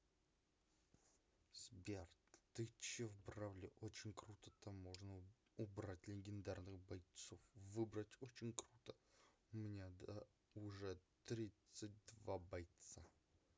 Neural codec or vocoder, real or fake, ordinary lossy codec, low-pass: none; real; none; none